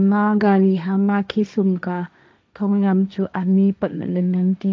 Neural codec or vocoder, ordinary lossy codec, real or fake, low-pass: codec, 16 kHz, 1.1 kbps, Voila-Tokenizer; none; fake; none